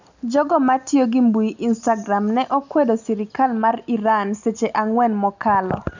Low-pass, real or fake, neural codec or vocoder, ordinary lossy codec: 7.2 kHz; real; none; none